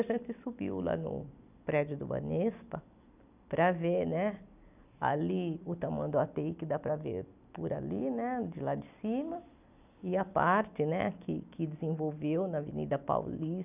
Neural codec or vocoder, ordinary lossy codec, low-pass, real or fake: none; none; 3.6 kHz; real